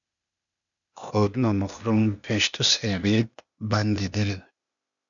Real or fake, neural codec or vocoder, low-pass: fake; codec, 16 kHz, 0.8 kbps, ZipCodec; 7.2 kHz